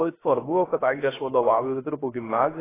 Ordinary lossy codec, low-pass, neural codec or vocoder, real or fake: AAC, 16 kbps; 3.6 kHz; codec, 16 kHz, 0.3 kbps, FocalCodec; fake